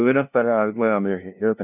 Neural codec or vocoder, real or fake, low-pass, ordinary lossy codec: codec, 16 kHz, 0.5 kbps, FunCodec, trained on LibriTTS, 25 frames a second; fake; 3.6 kHz; none